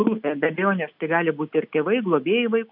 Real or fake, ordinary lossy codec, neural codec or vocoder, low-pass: real; AAC, 48 kbps; none; 5.4 kHz